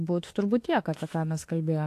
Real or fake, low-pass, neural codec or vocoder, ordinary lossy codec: fake; 14.4 kHz; autoencoder, 48 kHz, 32 numbers a frame, DAC-VAE, trained on Japanese speech; AAC, 64 kbps